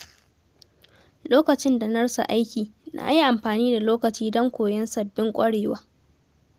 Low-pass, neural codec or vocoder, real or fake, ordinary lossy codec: 14.4 kHz; none; real; Opus, 24 kbps